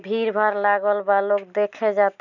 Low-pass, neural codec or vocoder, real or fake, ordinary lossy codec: 7.2 kHz; none; real; none